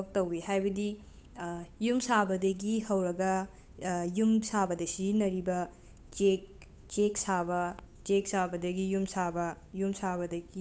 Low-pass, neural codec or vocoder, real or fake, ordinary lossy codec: none; codec, 16 kHz, 8 kbps, FunCodec, trained on Chinese and English, 25 frames a second; fake; none